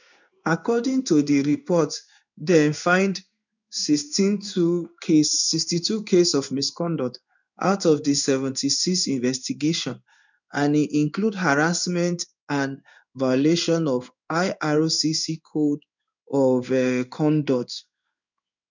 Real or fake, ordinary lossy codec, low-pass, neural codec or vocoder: fake; none; 7.2 kHz; codec, 16 kHz in and 24 kHz out, 1 kbps, XY-Tokenizer